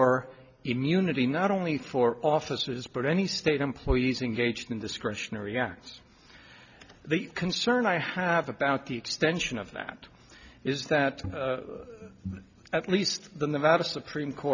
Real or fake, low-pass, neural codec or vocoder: real; 7.2 kHz; none